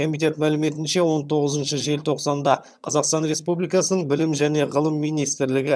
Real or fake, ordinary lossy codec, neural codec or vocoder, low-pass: fake; none; vocoder, 22.05 kHz, 80 mel bands, HiFi-GAN; none